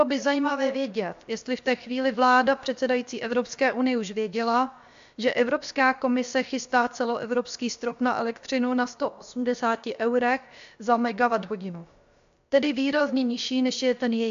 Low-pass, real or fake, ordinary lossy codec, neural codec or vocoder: 7.2 kHz; fake; AAC, 64 kbps; codec, 16 kHz, about 1 kbps, DyCAST, with the encoder's durations